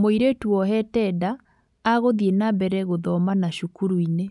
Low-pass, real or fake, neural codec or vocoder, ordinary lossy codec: 10.8 kHz; real; none; none